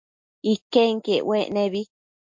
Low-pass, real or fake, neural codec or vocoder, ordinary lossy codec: 7.2 kHz; real; none; MP3, 48 kbps